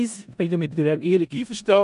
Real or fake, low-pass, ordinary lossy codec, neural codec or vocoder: fake; 10.8 kHz; none; codec, 16 kHz in and 24 kHz out, 0.4 kbps, LongCat-Audio-Codec, four codebook decoder